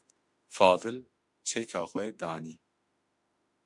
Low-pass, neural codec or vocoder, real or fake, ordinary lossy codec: 10.8 kHz; autoencoder, 48 kHz, 32 numbers a frame, DAC-VAE, trained on Japanese speech; fake; MP3, 64 kbps